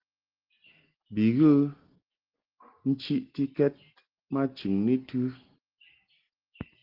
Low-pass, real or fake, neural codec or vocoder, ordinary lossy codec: 5.4 kHz; real; none; Opus, 16 kbps